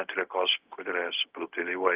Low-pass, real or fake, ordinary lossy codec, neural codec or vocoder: 3.6 kHz; fake; Opus, 32 kbps; codec, 16 kHz, 0.4 kbps, LongCat-Audio-Codec